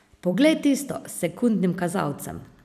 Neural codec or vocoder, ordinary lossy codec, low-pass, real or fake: vocoder, 44.1 kHz, 128 mel bands every 256 samples, BigVGAN v2; none; 14.4 kHz; fake